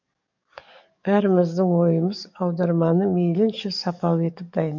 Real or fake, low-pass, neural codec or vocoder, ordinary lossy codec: fake; 7.2 kHz; codec, 44.1 kHz, 7.8 kbps, DAC; none